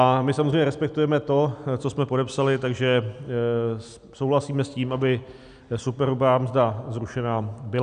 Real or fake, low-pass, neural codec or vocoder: real; 9.9 kHz; none